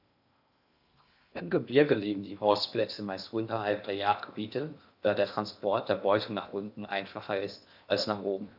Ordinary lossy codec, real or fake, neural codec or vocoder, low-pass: none; fake; codec, 16 kHz in and 24 kHz out, 0.6 kbps, FocalCodec, streaming, 2048 codes; 5.4 kHz